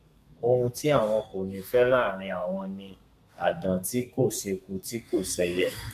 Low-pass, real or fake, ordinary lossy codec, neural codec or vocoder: 14.4 kHz; fake; MP3, 96 kbps; codec, 32 kHz, 1.9 kbps, SNAC